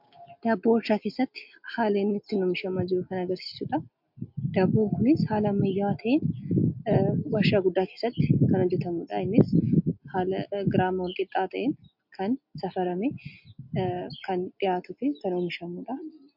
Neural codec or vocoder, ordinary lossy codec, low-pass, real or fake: none; MP3, 48 kbps; 5.4 kHz; real